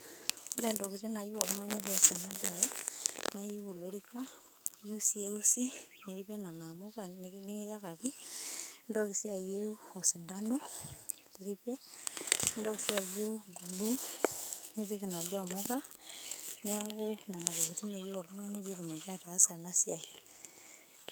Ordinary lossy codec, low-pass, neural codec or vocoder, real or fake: none; none; codec, 44.1 kHz, 2.6 kbps, SNAC; fake